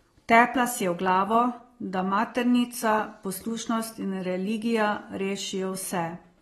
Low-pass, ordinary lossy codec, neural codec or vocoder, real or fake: 14.4 kHz; AAC, 32 kbps; none; real